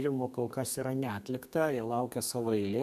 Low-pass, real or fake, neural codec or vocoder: 14.4 kHz; fake; codec, 32 kHz, 1.9 kbps, SNAC